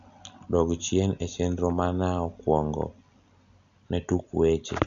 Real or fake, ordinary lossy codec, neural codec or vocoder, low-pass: real; none; none; 7.2 kHz